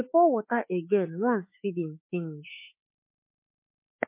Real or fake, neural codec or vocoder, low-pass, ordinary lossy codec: fake; autoencoder, 48 kHz, 32 numbers a frame, DAC-VAE, trained on Japanese speech; 3.6 kHz; MP3, 24 kbps